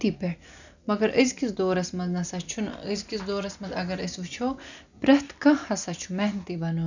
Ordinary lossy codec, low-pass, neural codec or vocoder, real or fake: none; 7.2 kHz; none; real